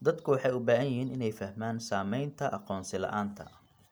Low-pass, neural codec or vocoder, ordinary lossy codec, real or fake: none; none; none; real